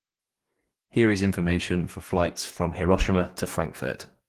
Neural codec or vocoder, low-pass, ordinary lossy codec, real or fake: codec, 44.1 kHz, 2.6 kbps, DAC; 14.4 kHz; Opus, 24 kbps; fake